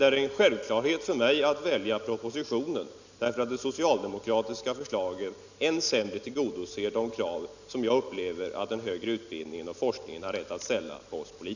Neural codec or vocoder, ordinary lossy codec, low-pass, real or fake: none; none; 7.2 kHz; real